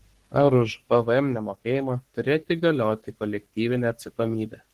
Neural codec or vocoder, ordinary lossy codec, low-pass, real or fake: codec, 44.1 kHz, 3.4 kbps, Pupu-Codec; Opus, 16 kbps; 14.4 kHz; fake